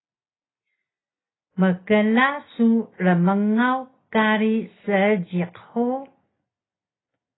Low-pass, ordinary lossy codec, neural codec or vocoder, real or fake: 7.2 kHz; AAC, 16 kbps; none; real